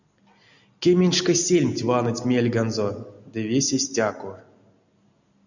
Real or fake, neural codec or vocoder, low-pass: real; none; 7.2 kHz